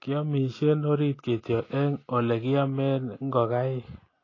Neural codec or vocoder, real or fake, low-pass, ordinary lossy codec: none; real; 7.2 kHz; AAC, 32 kbps